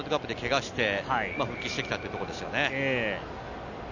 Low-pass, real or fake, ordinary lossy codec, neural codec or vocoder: 7.2 kHz; real; none; none